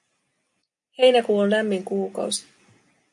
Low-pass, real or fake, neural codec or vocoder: 10.8 kHz; real; none